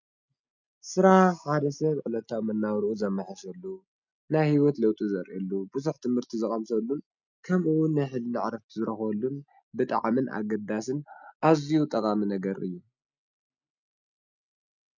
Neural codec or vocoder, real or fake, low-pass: none; real; 7.2 kHz